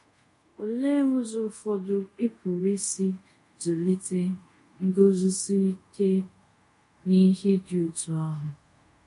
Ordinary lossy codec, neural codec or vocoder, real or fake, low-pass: MP3, 48 kbps; codec, 24 kHz, 0.5 kbps, DualCodec; fake; 10.8 kHz